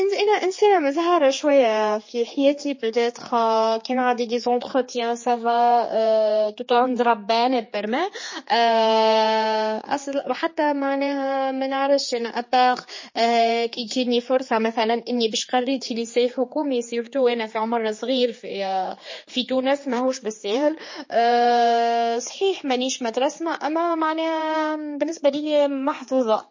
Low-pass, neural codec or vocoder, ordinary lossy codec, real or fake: 7.2 kHz; codec, 16 kHz, 4 kbps, X-Codec, HuBERT features, trained on general audio; MP3, 32 kbps; fake